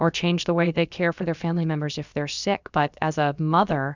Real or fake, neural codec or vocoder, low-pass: fake; codec, 16 kHz, about 1 kbps, DyCAST, with the encoder's durations; 7.2 kHz